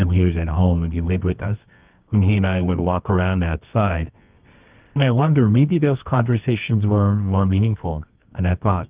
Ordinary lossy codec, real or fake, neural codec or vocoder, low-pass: Opus, 32 kbps; fake; codec, 24 kHz, 0.9 kbps, WavTokenizer, medium music audio release; 3.6 kHz